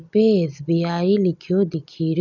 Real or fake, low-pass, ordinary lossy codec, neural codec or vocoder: real; 7.2 kHz; none; none